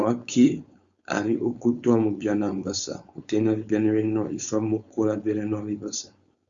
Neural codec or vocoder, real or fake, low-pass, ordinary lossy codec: codec, 16 kHz, 4.8 kbps, FACodec; fake; 7.2 kHz; Opus, 64 kbps